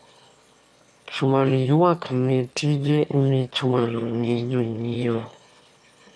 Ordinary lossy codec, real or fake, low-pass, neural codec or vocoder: none; fake; none; autoencoder, 22.05 kHz, a latent of 192 numbers a frame, VITS, trained on one speaker